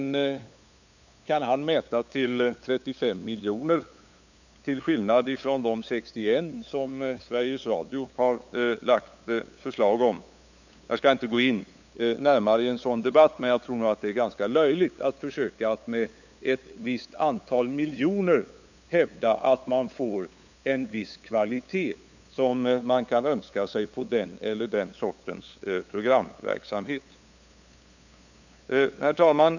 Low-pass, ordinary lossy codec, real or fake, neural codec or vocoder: 7.2 kHz; none; fake; codec, 16 kHz, 8 kbps, FunCodec, trained on LibriTTS, 25 frames a second